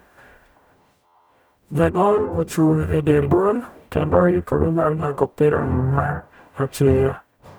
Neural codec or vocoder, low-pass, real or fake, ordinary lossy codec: codec, 44.1 kHz, 0.9 kbps, DAC; none; fake; none